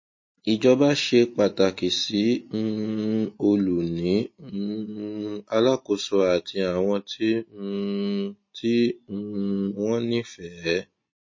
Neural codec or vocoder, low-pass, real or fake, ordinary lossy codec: none; 7.2 kHz; real; MP3, 32 kbps